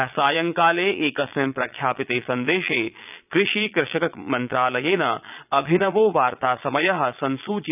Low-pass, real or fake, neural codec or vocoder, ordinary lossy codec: 3.6 kHz; fake; vocoder, 22.05 kHz, 80 mel bands, Vocos; none